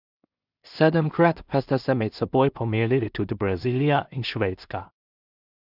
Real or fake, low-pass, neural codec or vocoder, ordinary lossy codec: fake; 5.4 kHz; codec, 16 kHz in and 24 kHz out, 0.4 kbps, LongCat-Audio-Codec, two codebook decoder; none